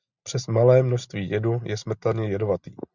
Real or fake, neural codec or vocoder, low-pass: real; none; 7.2 kHz